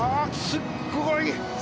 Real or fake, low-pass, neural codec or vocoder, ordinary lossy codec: real; none; none; none